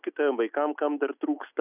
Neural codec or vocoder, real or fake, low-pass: none; real; 3.6 kHz